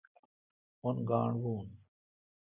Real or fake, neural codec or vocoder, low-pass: real; none; 3.6 kHz